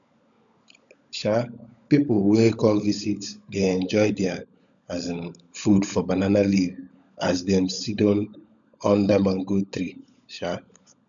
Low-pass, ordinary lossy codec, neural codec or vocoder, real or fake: 7.2 kHz; none; codec, 16 kHz, 16 kbps, FunCodec, trained on LibriTTS, 50 frames a second; fake